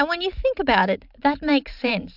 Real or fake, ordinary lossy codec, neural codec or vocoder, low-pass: fake; AAC, 48 kbps; vocoder, 44.1 kHz, 128 mel bands every 512 samples, BigVGAN v2; 5.4 kHz